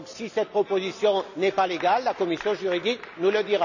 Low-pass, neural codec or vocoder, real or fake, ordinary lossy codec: 7.2 kHz; none; real; none